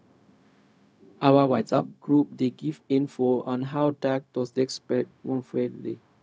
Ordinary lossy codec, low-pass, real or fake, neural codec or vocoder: none; none; fake; codec, 16 kHz, 0.4 kbps, LongCat-Audio-Codec